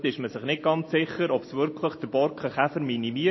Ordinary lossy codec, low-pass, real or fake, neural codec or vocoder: MP3, 24 kbps; 7.2 kHz; real; none